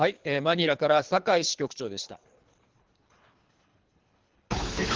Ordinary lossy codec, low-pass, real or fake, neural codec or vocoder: Opus, 16 kbps; 7.2 kHz; fake; codec, 24 kHz, 3 kbps, HILCodec